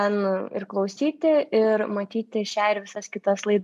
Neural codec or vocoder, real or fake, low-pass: none; real; 14.4 kHz